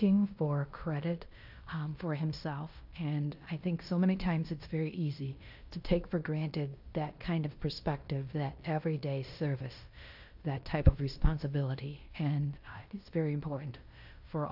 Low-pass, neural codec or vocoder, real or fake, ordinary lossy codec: 5.4 kHz; codec, 16 kHz in and 24 kHz out, 0.9 kbps, LongCat-Audio-Codec, fine tuned four codebook decoder; fake; AAC, 48 kbps